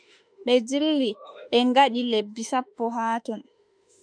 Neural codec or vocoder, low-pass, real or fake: autoencoder, 48 kHz, 32 numbers a frame, DAC-VAE, trained on Japanese speech; 9.9 kHz; fake